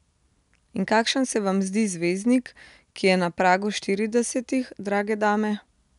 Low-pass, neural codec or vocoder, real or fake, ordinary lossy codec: 10.8 kHz; none; real; none